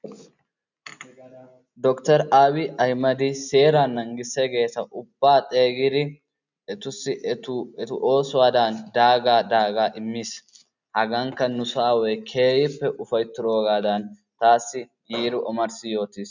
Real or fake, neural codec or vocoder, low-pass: real; none; 7.2 kHz